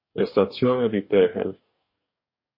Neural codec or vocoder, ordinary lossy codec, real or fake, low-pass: codec, 44.1 kHz, 2.6 kbps, DAC; MP3, 32 kbps; fake; 5.4 kHz